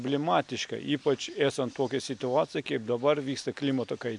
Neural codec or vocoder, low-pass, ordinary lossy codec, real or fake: none; 10.8 kHz; MP3, 64 kbps; real